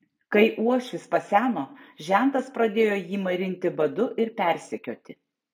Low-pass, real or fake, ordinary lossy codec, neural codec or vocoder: 19.8 kHz; real; MP3, 96 kbps; none